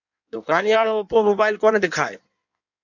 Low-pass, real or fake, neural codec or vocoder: 7.2 kHz; fake; codec, 16 kHz in and 24 kHz out, 1.1 kbps, FireRedTTS-2 codec